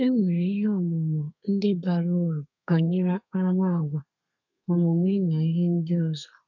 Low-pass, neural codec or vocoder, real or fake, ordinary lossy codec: 7.2 kHz; codec, 32 kHz, 1.9 kbps, SNAC; fake; none